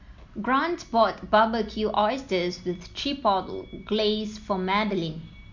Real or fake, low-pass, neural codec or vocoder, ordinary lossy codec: real; 7.2 kHz; none; MP3, 48 kbps